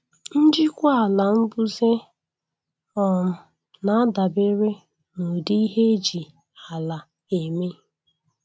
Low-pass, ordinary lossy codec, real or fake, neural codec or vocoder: none; none; real; none